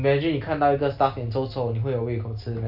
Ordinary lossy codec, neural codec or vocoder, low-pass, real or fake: none; none; 5.4 kHz; real